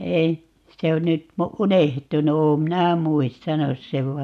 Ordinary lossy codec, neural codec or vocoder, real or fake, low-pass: none; none; real; 14.4 kHz